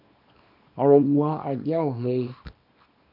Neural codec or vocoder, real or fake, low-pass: codec, 24 kHz, 0.9 kbps, WavTokenizer, small release; fake; 5.4 kHz